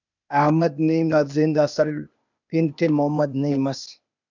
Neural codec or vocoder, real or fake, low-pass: codec, 16 kHz, 0.8 kbps, ZipCodec; fake; 7.2 kHz